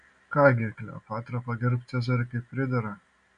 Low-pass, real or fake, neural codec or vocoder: 9.9 kHz; real; none